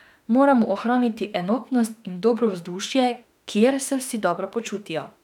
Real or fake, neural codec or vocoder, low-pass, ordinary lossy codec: fake; autoencoder, 48 kHz, 32 numbers a frame, DAC-VAE, trained on Japanese speech; 19.8 kHz; none